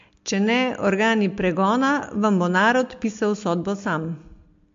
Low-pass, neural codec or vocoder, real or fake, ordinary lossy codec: 7.2 kHz; none; real; MP3, 48 kbps